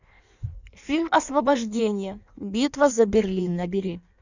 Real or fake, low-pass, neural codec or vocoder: fake; 7.2 kHz; codec, 16 kHz in and 24 kHz out, 1.1 kbps, FireRedTTS-2 codec